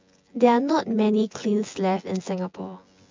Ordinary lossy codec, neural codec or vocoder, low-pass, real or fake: MP3, 64 kbps; vocoder, 24 kHz, 100 mel bands, Vocos; 7.2 kHz; fake